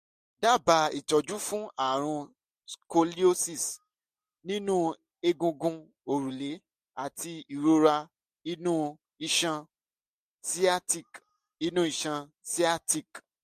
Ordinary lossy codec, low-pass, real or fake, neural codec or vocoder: MP3, 64 kbps; 14.4 kHz; real; none